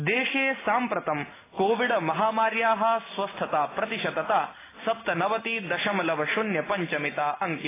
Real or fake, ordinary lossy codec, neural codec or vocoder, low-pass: real; AAC, 16 kbps; none; 3.6 kHz